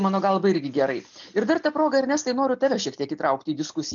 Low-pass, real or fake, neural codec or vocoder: 7.2 kHz; real; none